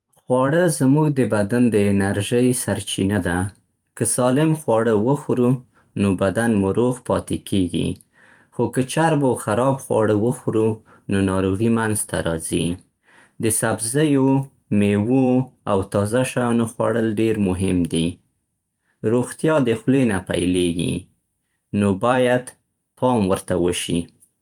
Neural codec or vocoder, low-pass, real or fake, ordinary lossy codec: vocoder, 44.1 kHz, 128 mel bands every 512 samples, BigVGAN v2; 19.8 kHz; fake; Opus, 32 kbps